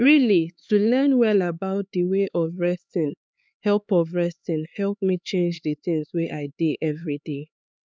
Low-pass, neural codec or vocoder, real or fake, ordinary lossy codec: none; codec, 16 kHz, 4 kbps, X-Codec, HuBERT features, trained on LibriSpeech; fake; none